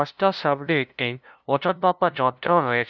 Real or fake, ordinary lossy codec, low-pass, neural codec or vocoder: fake; none; none; codec, 16 kHz, 0.5 kbps, FunCodec, trained on LibriTTS, 25 frames a second